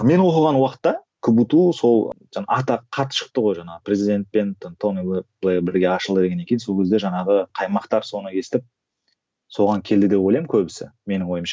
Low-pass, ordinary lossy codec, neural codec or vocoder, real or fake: none; none; none; real